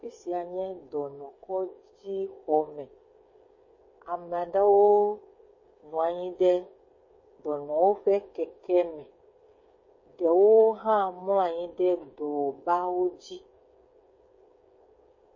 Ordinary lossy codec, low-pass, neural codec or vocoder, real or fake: MP3, 32 kbps; 7.2 kHz; codec, 16 kHz, 8 kbps, FreqCodec, smaller model; fake